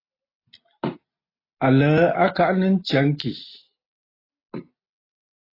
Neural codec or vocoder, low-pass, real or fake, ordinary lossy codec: none; 5.4 kHz; real; AAC, 24 kbps